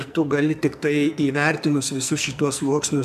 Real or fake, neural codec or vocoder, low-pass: fake; codec, 44.1 kHz, 2.6 kbps, SNAC; 14.4 kHz